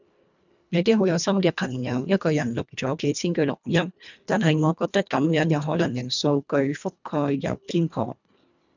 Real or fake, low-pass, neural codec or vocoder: fake; 7.2 kHz; codec, 24 kHz, 1.5 kbps, HILCodec